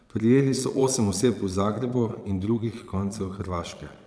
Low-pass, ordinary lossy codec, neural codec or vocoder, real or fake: none; none; vocoder, 22.05 kHz, 80 mel bands, Vocos; fake